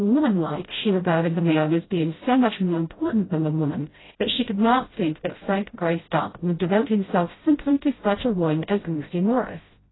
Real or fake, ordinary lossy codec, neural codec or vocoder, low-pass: fake; AAC, 16 kbps; codec, 16 kHz, 0.5 kbps, FreqCodec, smaller model; 7.2 kHz